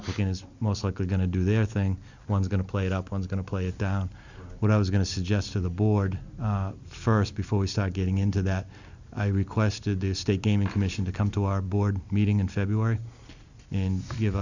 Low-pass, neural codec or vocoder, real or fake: 7.2 kHz; none; real